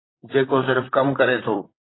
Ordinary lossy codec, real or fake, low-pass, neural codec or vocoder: AAC, 16 kbps; fake; 7.2 kHz; vocoder, 44.1 kHz, 128 mel bands, Pupu-Vocoder